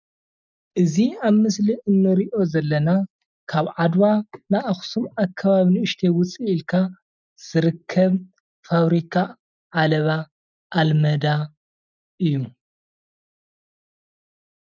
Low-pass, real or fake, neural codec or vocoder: 7.2 kHz; real; none